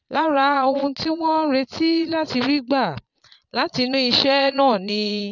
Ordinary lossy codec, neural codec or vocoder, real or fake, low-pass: none; vocoder, 22.05 kHz, 80 mel bands, Vocos; fake; 7.2 kHz